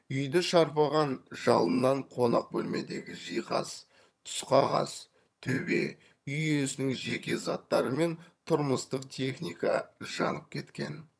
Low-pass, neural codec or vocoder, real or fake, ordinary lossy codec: none; vocoder, 22.05 kHz, 80 mel bands, HiFi-GAN; fake; none